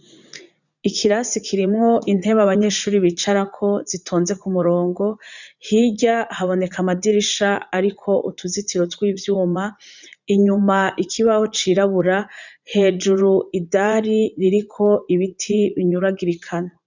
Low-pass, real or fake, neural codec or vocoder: 7.2 kHz; fake; vocoder, 44.1 kHz, 80 mel bands, Vocos